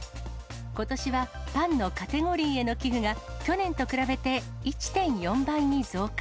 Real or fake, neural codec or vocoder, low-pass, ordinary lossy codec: real; none; none; none